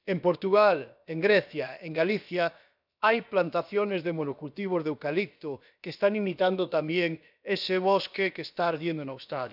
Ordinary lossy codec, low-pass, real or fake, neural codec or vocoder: none; 5.4 kHz; fake; codec, 16 kHz, about 1 kbps, DyCAST, with the encoder's durations